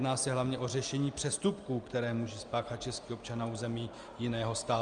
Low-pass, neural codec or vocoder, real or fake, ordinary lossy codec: 9.9 kHz; none; real; Opus, 32 kbps